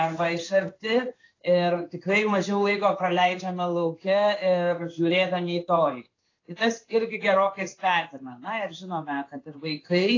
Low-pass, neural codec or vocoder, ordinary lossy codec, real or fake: 7.2 kHz; codec, 16 kHz in and 24 kHz out, 1 kbps, XY-Tokenizer; AAC, 32 kbps; fake